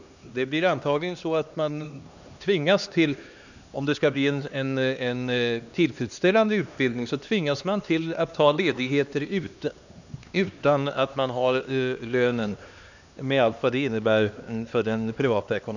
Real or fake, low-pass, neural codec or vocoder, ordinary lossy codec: fake; 7.2 kHz; codec, 16 kHz, 2 kbps, X-Codec, HuBERT features, trained on LibriSpeech; none